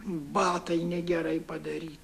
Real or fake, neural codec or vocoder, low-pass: fake; autoencoder, 48 kHz, 128 numbers a frame, DAC-VAE, trained on Japanese speech; 14.4 kHz